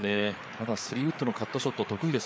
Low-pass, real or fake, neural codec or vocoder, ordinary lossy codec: none; fake; codec, 16 kHz, 4 kbps, FreqCodec, larger model; none